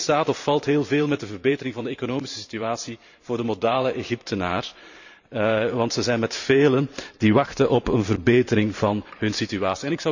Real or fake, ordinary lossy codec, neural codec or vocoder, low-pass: fake; none; vocoder, 44.1 kHz, 128 mel bands every 512 samples, BigVGAN v2; 7.2 kHz